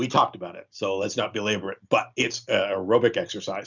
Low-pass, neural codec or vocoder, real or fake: 7.2 kHz; none; real